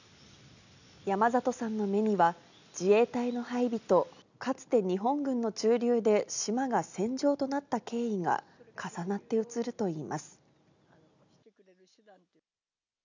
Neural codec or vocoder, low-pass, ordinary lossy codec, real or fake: none; 7.2 kHz; none; real